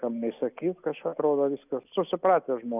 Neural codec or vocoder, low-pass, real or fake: none; 3.6 kHz; real